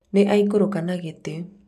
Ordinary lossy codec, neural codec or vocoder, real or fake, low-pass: none; none; real; 14.4 kHz